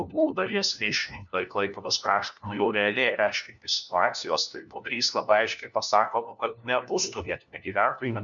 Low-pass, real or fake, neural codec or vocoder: 7.2 kHz; fake; codec, 16 kHz, 1 kbps, FunCodec, trained on LibriTTS, 50 frames a second